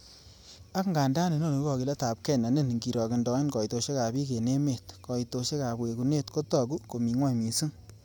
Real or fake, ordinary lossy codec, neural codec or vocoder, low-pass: real; none; none; none